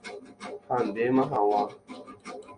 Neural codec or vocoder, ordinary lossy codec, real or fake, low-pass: none; Opus, 64 kbps; real; 9.9 kHz